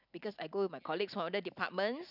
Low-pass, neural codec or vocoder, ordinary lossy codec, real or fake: 5.4 kHz; none; none; real